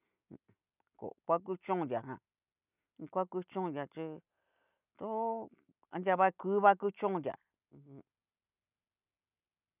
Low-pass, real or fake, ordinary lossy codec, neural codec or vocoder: 3.6 kHz; real; none; none